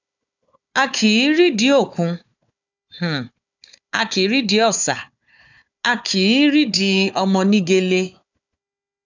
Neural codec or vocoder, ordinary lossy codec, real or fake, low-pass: codec, 16 kHz, 4 kbps, FunCodec, trained on Chinese and English, 50 frames a second; none; fake; 7.2 kHz